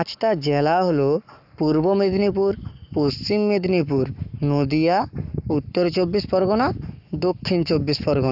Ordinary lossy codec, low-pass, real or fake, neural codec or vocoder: none; 5.4 kHz; fake; codec, 44.1 kHz, 7.8 kbps, Pupu-Codec